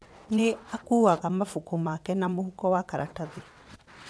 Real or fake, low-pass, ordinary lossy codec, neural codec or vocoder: fake; none; none; vocoder, 22.05 kHz, 80 mel bands, WaveNeXt